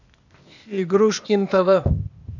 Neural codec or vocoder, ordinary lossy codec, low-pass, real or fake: codec, 16 kHz, 0.8 kbps, ZipCodec; none; 7.2 kHz; fake